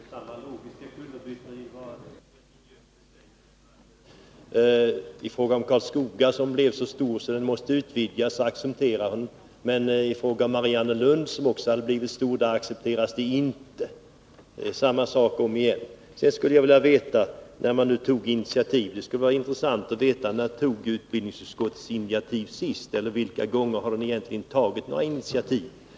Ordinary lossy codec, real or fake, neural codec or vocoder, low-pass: none; real; none; none